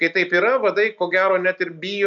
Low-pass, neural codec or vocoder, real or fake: 7.2 kHz; none; real